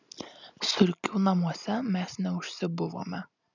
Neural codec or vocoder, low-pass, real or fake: none; 7.2 kHz; real